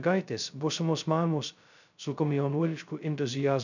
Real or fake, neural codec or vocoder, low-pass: fake; codec, 16 kHz, 0.2 kbps, FocalCodec; 7.2 kHz